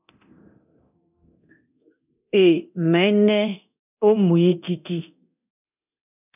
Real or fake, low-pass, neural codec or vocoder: fake; 3.6 kHz; codec, 24 kHz, 0.9 kbps, DualCodec